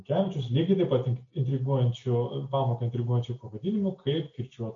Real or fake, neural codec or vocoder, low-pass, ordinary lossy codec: real; none; 7.2 kHz; MP3, 48 kbps